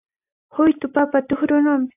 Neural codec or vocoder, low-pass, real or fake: none; 3.6 kHz; real